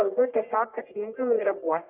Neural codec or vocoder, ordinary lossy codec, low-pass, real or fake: codec, 44.1 kHz, 1.7 kbps, Pupu-Codec; Opus, 24 kbps; 3.6 kHz; fake